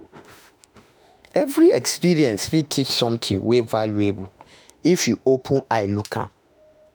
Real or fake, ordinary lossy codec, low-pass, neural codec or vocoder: fake; none; none; autoencoder, 48 kHz, 32 numbers a frame, DAC-VAE, trained on Japanese speech